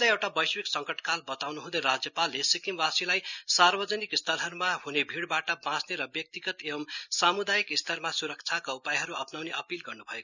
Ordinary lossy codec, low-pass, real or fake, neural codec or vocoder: none; 7.2 kHz; real; none